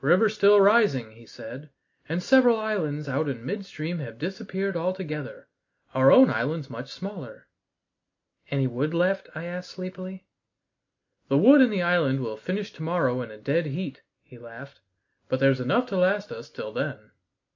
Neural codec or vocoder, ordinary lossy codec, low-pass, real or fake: none; MP3, 64 kbps; 7.2 kHz; real